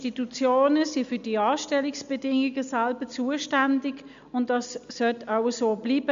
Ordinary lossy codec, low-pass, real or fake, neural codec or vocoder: MP3, 64 kbps; 7.2 kHz; real; none